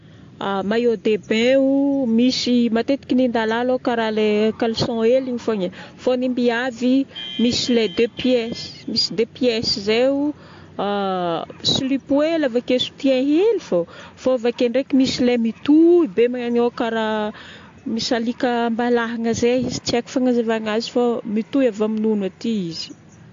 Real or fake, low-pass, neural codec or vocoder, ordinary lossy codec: real; 7.2 kHz; none; AAC, 48 kbps